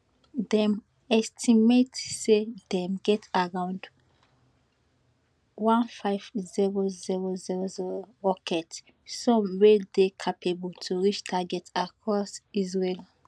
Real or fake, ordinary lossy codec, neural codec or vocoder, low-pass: real; none; none; none